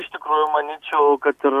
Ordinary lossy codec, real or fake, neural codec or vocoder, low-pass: Opus, 64 kbps; real; none; 14.4 kHz